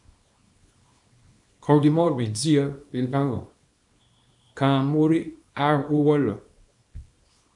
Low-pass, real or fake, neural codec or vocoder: 10.8 kHz; fake; codec, 24 kHz, 0.9 kbps, WavTokenizer, small release